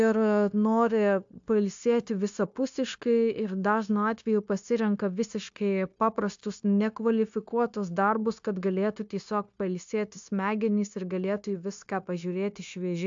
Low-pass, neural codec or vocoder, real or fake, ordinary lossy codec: 7.2 kHz; codec, 16 kHz, 0.9 kbps, LongCat-Audio-Codec; fake; AAC, 64 kbps